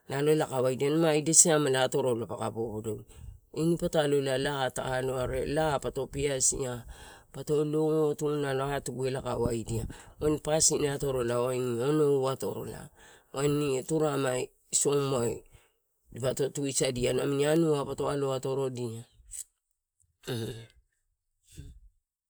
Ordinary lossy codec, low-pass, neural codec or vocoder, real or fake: none; none; none; real